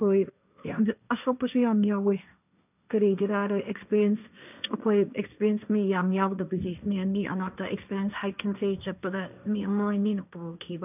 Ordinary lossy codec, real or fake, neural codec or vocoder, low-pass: none; fake; codec, 16 kHz, 1.1 kbps, Voila-Tokenizer; 3.6 kHz